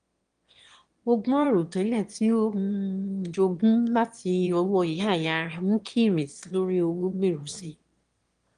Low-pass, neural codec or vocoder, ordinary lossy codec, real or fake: 9.9 kHz; autoencoder, 22.05 kHz, a latent of 192 numbers a frame, VITS, trained on one speaker; Opus, 24 kbps; fake